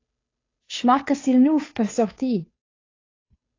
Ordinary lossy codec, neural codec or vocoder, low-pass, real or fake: AAC, 32 kbps; codec, 16 kHz, 2 kbps, FunCodec, trained on Chinese and English, 25 frames a second; 7.2 kHz; fake